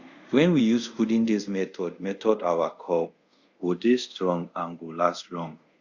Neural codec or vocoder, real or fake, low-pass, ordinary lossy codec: codec, 24 kHz, 0.5 kbps, DualCodec; fake; 7.2 kHz; Opus, 64 kbps